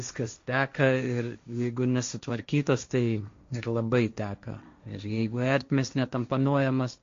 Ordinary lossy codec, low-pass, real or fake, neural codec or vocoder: MP3, 48 kbps; 7.2 kHz; fake; codec, 16 kHz, 1.1 kbps, Voila-Tokenizer